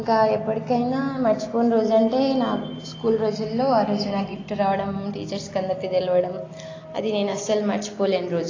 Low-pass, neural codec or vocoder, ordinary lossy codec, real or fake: 7.2 kHz; none; AAC, 32 kbps; real